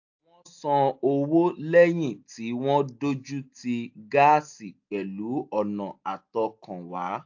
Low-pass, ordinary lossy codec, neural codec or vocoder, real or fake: 7.2 kHz; AAC, 48 kbps; none; real